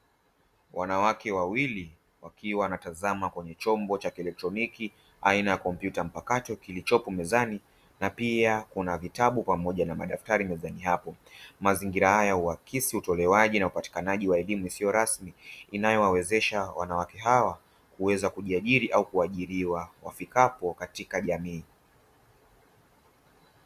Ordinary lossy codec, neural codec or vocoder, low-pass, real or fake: Opus, 64 kbps; none; 14.4 kHz; real